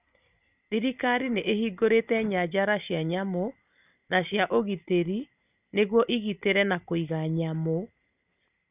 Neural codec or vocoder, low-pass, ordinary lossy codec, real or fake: none; 3.6 kHz; none; real